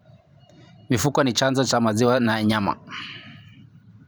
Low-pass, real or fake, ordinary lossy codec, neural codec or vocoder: none; fake; none; vocoder, 44.1 kHz, 128 mel bands every 512 samples, BigVGAN v2